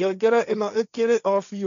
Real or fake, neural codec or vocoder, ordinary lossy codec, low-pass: fake; codec, 16 kHz, 1.1 kbps, Voila-Tokenizer; none; 7.2 kHz